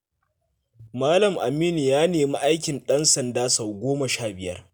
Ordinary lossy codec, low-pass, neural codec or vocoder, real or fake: none; none; none; real